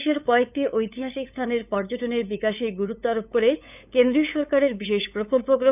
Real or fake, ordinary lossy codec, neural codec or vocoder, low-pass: fake; none; codec, 16 kHz, 8 kbps, FunCodec, trained on LibriTTS, 25 frames a second; 3.6 kHz